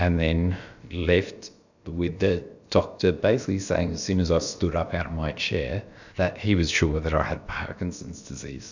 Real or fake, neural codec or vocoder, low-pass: fake; codec, 16 kHz, about 1 kbps, DyCAST, with the encoder's durations; 7.2 kHz